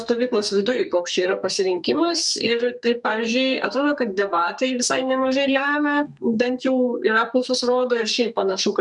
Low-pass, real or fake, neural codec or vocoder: 10.8 kHz; fake; codec, 32 kHz, 1.9 kbps, SNAC